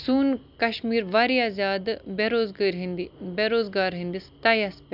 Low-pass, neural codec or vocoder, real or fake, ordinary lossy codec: 5.4 kHz; none; real; none